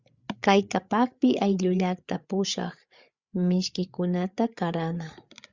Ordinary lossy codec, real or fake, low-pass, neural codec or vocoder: Opus, 64 kbps; fake; 7.2 kHz; codec, 16 kHz, 8 kbps, FreqCodec, larger model